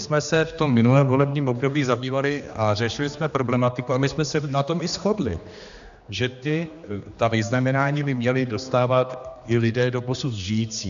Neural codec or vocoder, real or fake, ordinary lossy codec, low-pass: codec, 16 kHz, 2 kbps, X-Codec, HuBERT features, trained on general audio; fake; AAC, 96 kbps; 7.2 kHz